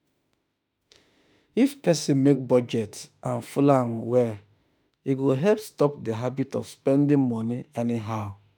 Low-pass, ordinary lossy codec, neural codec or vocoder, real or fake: none; none; autoencoder, 48 kHz, 32 numbers a frame, DAC-VAE, trained on Japanese speech; fake